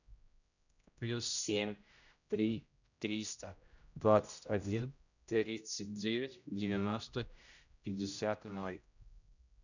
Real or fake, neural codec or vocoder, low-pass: fake; codec, 16 kHz, 0.5 kbps, X-Codec, HuBERT features, trained on general audio; 7.2 kHz